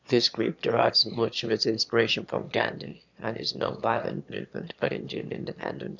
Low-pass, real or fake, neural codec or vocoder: 7.2 kHz; fake; autoencoder, 22.05 kHz, a latent of 192 numbers a frame, VITS, trained on one speaker